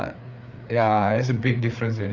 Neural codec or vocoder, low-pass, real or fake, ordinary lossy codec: codec, 16 kHz, 4 kbps, FreqCodec, larger model; 7.2 kHz; fake; none